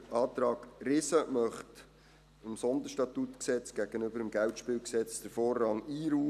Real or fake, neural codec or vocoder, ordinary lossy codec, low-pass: real; none; none; 14.4 kHz